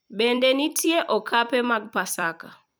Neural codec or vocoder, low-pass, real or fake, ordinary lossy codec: vocoder, 44.1 kHz, 128 mel bands every 256 samples, BigVGAN v2; none; fake; none